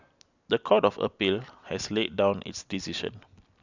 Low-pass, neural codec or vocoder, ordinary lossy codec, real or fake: 7.2 kHz; none; none; real